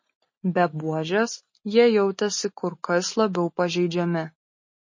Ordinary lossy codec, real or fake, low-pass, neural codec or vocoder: MP3, 32 kbps; real; 7.2 kHz; none